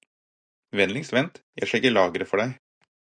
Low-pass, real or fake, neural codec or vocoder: 9.9 kHz; real; none